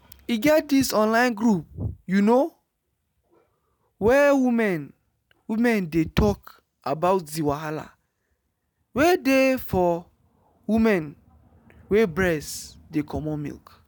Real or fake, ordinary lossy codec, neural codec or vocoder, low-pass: real; none; none; none